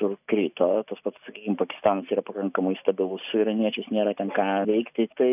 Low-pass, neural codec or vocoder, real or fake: 3.6 kHz; none; real